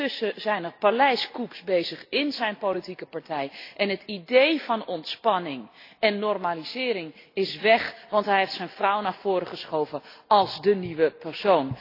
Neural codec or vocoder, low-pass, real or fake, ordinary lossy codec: none; 5.4 kHz; real; AAC, 32 kbps